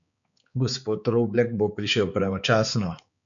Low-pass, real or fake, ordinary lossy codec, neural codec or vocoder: 7.2 kHz; fake; MP3, 96 kbps; codec, 16 kHz, 4 kbps, X-Codec, HuBERT features, trained on balanced general audio